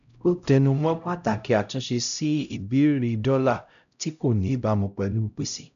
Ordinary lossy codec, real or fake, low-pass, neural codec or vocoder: none; fake; 7.2 kHz; codec, 16 kHz, 0.5 kbps, X-Codec, HuBERT features, trained on LibriSpeech